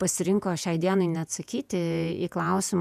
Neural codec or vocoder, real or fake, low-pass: vocoder, 48 kHz, 128 mel bands, Vocos; fake; 14.4 kHz